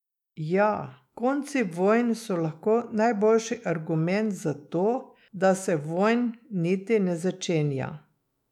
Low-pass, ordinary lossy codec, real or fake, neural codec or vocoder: 19.8 kHz; none; fake; autoencoder, 48 kHz, 128 numbers a frame, DAC-VAE, trained on Japanese speech